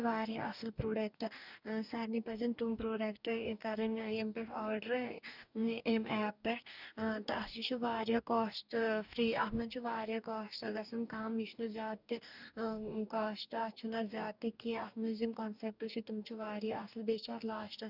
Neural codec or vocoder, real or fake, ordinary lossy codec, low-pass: codec, 44.1 kHz, 2.6 kbps, DAC; fake; none; 5.4 kHz